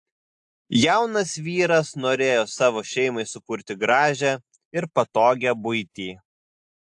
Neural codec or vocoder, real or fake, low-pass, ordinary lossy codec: none; real; 10.8 kHz; AAC, 64 kbps